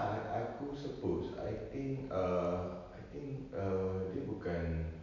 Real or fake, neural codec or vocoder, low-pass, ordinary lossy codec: real; none; 7.2 kHz; none